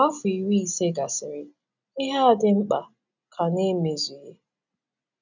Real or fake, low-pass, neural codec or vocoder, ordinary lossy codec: real; 7.2 kHz; none; none